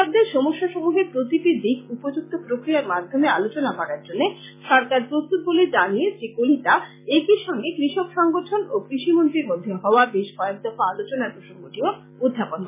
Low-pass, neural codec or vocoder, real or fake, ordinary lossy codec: 3.6 kHz; none; real; MP3, 16 kbps